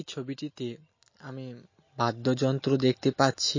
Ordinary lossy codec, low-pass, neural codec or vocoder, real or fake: MP3, 32 kbps; 7.2 kHz; none; real